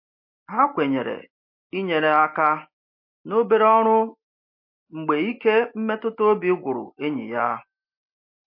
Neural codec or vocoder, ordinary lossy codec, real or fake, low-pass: none; MP3, 32 kbps; real; 5.4 kHz